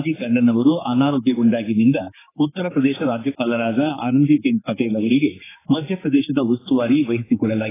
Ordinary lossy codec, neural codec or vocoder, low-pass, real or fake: AAC, 16 kbps; codec, 16 kHz, 4 kbps, X-Codec, HuBERT features, trained on general audio; 3.6 kHz; fake